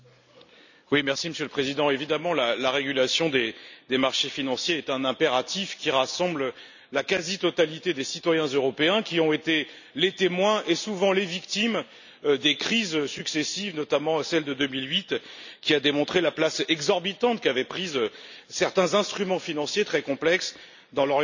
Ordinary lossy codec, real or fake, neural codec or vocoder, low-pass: none; real; none; 7.2 kHz